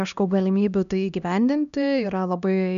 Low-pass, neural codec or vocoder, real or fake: 7.2 kHz; codec, 16 kHz, 1 kbps, X-Codec, HuBERT features, trained on LibriSpeech; fake